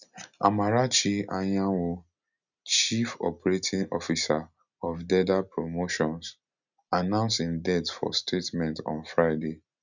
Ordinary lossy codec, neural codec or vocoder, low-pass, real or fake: none; none; 7.2 kHz; real